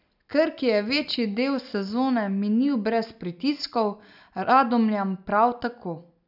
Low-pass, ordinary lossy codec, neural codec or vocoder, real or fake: 5.4 kHz; none; none; real